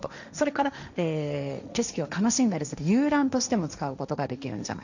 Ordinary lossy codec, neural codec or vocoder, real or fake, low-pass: none; codec, 16 kHz, 1.1 kbps, Voila-Tokenizer; fake; 7.2 kHz